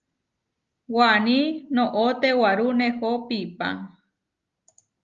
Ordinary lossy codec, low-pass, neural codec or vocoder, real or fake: Opus, 32 kbps; 7.2 kHz; none; real